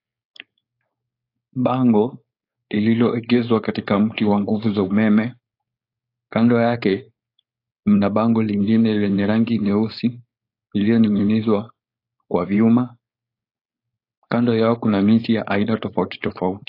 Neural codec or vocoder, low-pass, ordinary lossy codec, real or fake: codec, 16 kHz, 4.8 kbps, FACodec; 5.4 kHz; AAC, 32 kbps; fake